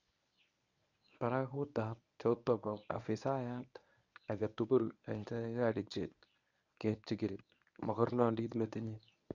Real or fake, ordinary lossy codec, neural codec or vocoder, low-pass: fake; none; codec, 24 kHz, 0.9 kbps, WavTokenizer, medium speech release version 1; 7.2 kHz